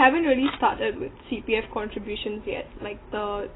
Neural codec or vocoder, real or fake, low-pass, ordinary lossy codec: none; real; 7.2 kHz; AAC, 16 kbps